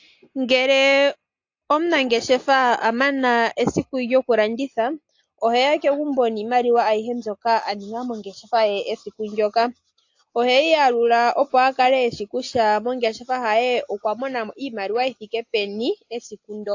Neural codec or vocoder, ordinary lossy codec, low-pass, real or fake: none; AAC, 48 kbps; 7.2 kHz; real